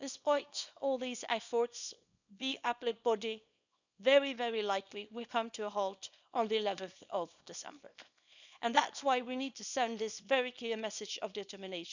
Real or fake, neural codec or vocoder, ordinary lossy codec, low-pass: fake; codec, 24 kHz, 0.9 kbps, WavTokenizer, small release; none; 7.2 kHz